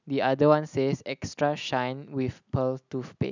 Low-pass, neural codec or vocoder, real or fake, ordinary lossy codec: 7.2 kHz; none; real; none